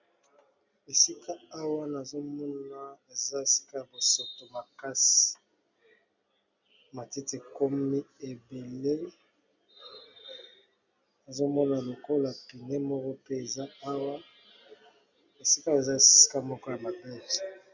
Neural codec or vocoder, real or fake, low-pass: none; real; 7.2 kHz